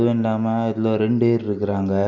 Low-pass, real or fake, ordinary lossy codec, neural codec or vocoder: 7.2 kHz; real; none; none